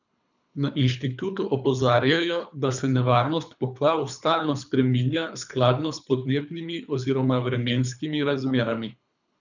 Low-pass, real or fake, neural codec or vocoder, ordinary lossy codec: 7.2 kHz; fake; codec, 24 kHz, 3 kbps, HILCodec; none